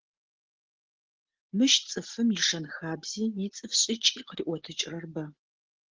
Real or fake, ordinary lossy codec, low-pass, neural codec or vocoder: real; Opus, 16 kbps; 7.2 kHz; none